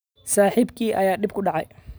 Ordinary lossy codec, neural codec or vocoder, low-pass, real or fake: none; none; none; real